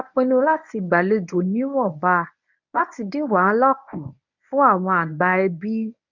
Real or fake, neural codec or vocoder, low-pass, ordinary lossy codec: fake; codec, 24 kHz, 0.9 kbps, WavTokenizer, medium speech release version 1; 7.2 kHz; none